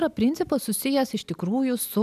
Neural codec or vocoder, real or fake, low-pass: none; real; 14.4 kHz